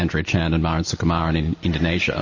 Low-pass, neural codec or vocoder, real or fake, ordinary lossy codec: 7.2 kHz; none; real; MP3, 32 kbps